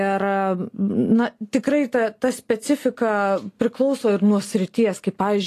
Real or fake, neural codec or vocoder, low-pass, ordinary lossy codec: real; none; 14.4 kHz; AAC, 48 kbps